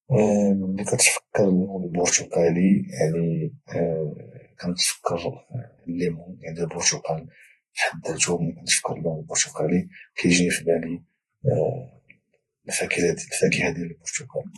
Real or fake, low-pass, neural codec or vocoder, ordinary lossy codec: fake; 19.8 kHz; vocoder, 48 kHz, 128 mel bands, Vocos; AAC, 32 kbps